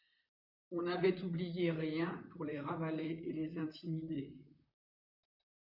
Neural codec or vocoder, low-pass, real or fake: vocoder, 44.1 kHz, 128 mel bands, Pupu-Vocoder; 5.4 kHz; fake